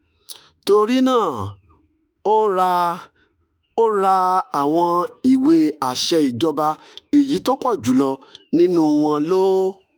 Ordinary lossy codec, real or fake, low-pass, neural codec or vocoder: none; fake; none; autoencoder, 48 kHz, 32 numbers a frame, DAC-VAE, trained on Japanese speech